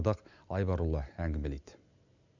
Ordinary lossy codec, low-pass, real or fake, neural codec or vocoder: none; 7.2 kHz; real; none